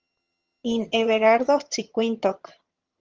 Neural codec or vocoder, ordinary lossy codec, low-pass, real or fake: vocoder, 22.05 kHz, 80 mel bands, HiFi-GAN; Opus, 24 kbps; 7.2 kHz; fake